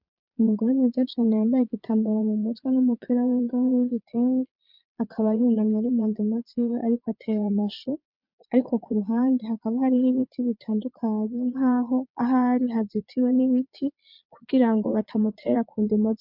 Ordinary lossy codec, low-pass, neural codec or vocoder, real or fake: MP3, 48 kbps; 5.4 kHz; vocoder, 22.05 kHz, 80 mel bands, Vocos; fake